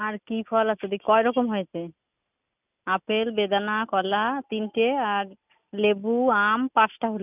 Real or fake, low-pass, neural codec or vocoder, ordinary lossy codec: real; 3.6 kHz; none; none